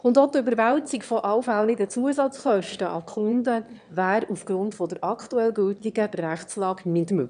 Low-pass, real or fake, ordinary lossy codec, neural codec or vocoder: 9.9 kHz; fake; none; autoencoder, 22.05 kHz, a latent of 192 numbers a frame, VITS, trained on one speaker